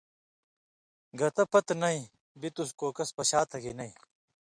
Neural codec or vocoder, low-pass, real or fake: none; 9.9 kHz; real